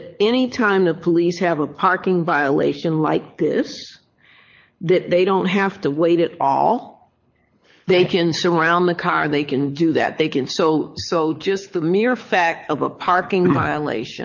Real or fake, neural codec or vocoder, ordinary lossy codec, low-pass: fake; codec, 24 kHz, 6 kbps, HILCodec; MP3, 48 kbps; 7.2 kHz